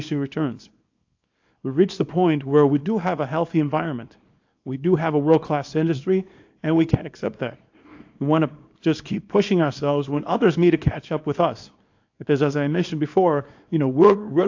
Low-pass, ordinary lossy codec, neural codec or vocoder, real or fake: 7.2 kHz; AAC, 48 kbps; codec, 24 kHz, 0.9 kbps, WavTokenizer, small release; fake